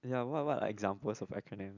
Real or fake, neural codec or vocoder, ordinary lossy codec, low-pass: real; none; none; 7.2 kHz